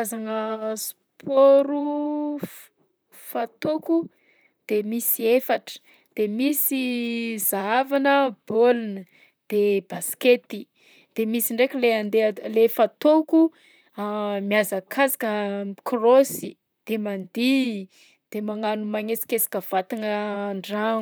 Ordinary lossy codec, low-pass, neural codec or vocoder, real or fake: none; none; vocoder, 44.1 kHz, 128 mel bands, Pupu-Vocoder; fake